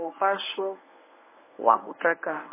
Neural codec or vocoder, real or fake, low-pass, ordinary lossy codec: codec, 24 kHz, 0.9 kbps, WavTokenizer, medium speech release version 1; fake; 3.6 kHz; MP3, 16 kbps